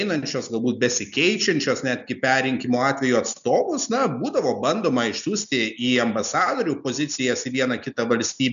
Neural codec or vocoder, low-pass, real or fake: none; 7.2 kHz; real